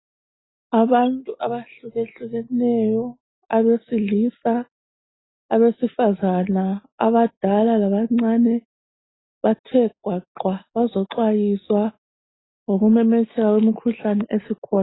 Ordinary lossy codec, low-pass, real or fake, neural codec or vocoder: AAC, 16 kbps; 7.2 kHz; real; none